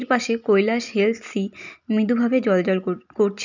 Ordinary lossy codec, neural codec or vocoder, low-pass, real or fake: none; none; 7.2 kHz; real